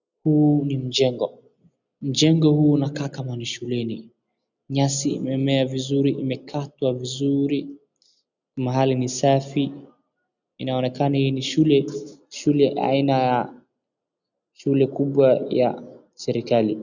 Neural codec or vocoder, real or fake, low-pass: none; real; 7.2 kHz